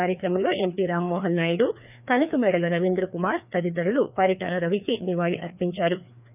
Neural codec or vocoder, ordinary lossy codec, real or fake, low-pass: codec, 16 kHz, 2 kbps, FreqCodec, larger model; none; fake; 3.6 kHz